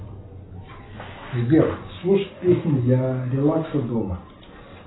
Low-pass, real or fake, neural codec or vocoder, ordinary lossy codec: 7.2 kHz; real; none; AAC, 16 kbps